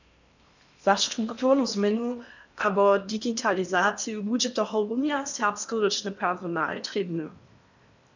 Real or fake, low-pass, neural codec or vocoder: fake; 7.2 kHz; codec, 16 kHz in and 24 kHz out, 0.8 kbps, FocalCodec, streaming, 65536 codes